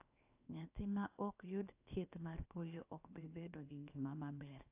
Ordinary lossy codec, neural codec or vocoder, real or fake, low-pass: AAC, 32 kbps; codec, 16 kHz, 0.7 kbps, FocalCodec; fake; 3.6 kHz